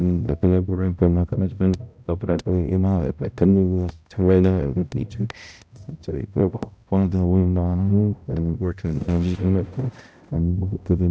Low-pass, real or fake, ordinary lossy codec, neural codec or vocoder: none; fake; none; codec, 16 kHz, 0.5 kbps, X-Codec, HuBERT features, trained on balanced general audio